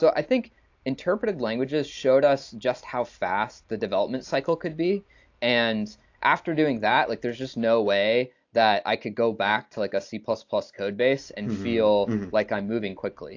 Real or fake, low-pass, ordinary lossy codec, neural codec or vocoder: real; 7.2 kHz; AAC, 48 kbps; none